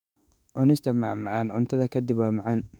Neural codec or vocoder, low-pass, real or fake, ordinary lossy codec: autoencoder, 48 kHz, 32 numbers a frame, DAC-VAE, trained on Japanese speech; 19.8 kHz; fake; none